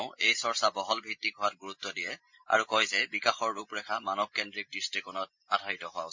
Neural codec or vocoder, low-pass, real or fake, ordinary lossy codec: none; 7.2 kHz; real; MP3, 48 kbps